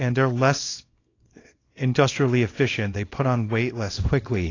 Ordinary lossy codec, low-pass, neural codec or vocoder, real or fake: AAC, 32 kbps; 7.2 kHz; codec, 24 kHz, 0.9 kbps, WavTokenizer, small release; fake